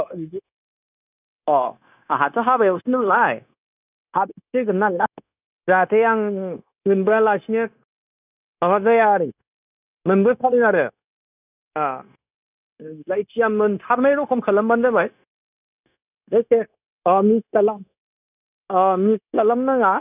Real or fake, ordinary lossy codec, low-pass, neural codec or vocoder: fake; none; 3.6 kHz; codec, 16 kHz, 0.9 kbps, LongCat-Audio-Codec